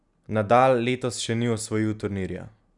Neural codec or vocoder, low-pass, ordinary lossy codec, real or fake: none; 10.8 kHz; none; real